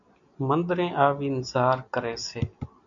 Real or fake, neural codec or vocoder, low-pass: real; none; 7.2 kHz